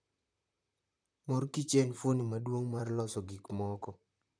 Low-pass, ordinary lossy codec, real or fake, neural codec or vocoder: 9.9 kHz; none; fake; vocoder, 44.1 kHz, 128 mel bands, Pupu-Vocoder